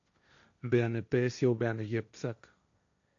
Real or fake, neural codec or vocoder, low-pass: fake; codec, 16 kHz, 1.1 kbps, Voila-Tokenizer; 7.2 kHz